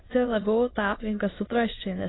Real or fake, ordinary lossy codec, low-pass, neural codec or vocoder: fake; AAC, 16 kbps; 7.2 kHz; autoencoder, 22.05 kHz, a latent of 192 numbers a frame, VITS, trained on many speakers